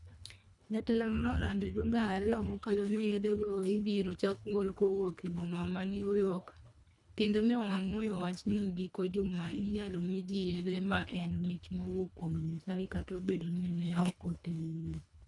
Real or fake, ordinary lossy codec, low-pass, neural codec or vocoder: fake; none; 10.8 kHz; codec, 24 kHz, 1.5 kbps, HILCodec